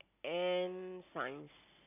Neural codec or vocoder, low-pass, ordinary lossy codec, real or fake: none; 3.6 kHz; none; real